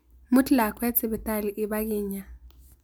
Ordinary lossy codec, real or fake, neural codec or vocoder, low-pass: none; real; none; none